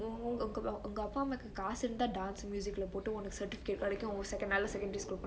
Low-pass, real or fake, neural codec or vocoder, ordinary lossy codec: none; real; none; none